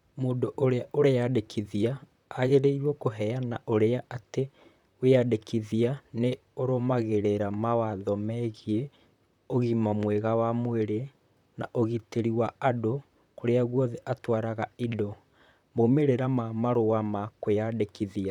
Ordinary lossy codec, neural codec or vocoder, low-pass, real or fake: none; vocoder, 44.1 kHz, 128 mel bands, Pupu-Vocoder; 19.8 kHz; fake